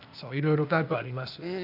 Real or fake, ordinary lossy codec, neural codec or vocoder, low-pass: fake; none; codec, 16 kHz, 0.8 kbps, ZipCodec; 5.4 kHz